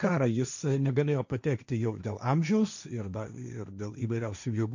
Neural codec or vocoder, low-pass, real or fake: codec, 16 kHz, 1.1 kbps, Voila-Tokenizer; 7.2 kHz; fake